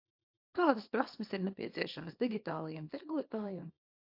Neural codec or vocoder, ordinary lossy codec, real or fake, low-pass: codec, 24 kHz, 0.9 kbps, WavTokenizer, small release; AAC, 48 kbps; fake; 5.4 kHz